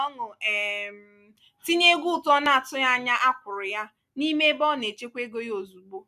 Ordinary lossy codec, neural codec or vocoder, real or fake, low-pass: none; none; real; 14.4 kHz